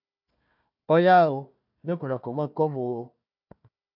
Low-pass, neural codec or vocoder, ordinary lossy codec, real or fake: 5.4 kHz; codec, 16 kHz, 1 kbps, FunCodec, trained on Chinese and English, 50 frames a second; AAC, 48 kbps; fake